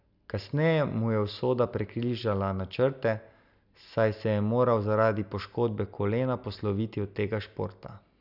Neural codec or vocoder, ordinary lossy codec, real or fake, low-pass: none; none; real; 5.4 kHz